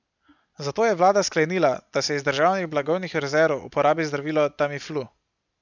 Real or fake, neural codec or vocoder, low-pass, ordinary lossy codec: real; none; 7.2 kHz; none